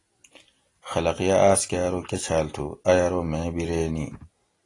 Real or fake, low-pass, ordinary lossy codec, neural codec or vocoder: real; 10.8 kHz; AAC, 32 kbps; none